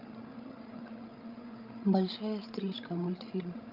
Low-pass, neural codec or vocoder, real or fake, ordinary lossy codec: 5.4 kHz; codec, 16 kHz, 16 kbps, FreqCodec, larger model; fake; Opus, 24 kbps